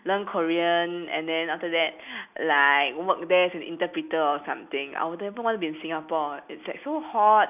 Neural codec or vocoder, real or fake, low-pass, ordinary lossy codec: none; real; 3.6 kHz; none